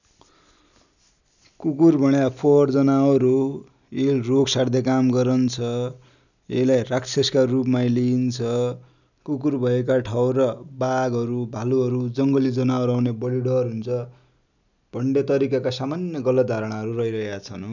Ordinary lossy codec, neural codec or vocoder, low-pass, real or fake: none; none; 7.2 kHz; real